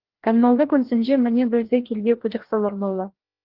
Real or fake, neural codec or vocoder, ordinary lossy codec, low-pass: fake; codec, 16 kHz, 1 kbps, FreqCodec, larger model; Opus, 16 kbps; 5.4 kHz